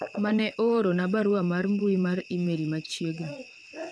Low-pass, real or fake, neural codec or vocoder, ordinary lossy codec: none; fake; vocoder, 22.05 kHz, 80 mel bands, WaveNeXt; none